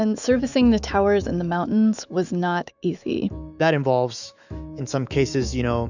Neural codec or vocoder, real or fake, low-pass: autoencoder, 48 kHz, 128 numbers a frame, DAC-VAE, trained on Japanese speech; fake; 7.2 kHz